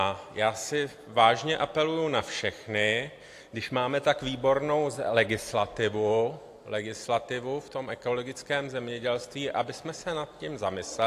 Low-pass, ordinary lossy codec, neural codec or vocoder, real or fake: 14.4 kHz; AAC, 64 kbps; none; real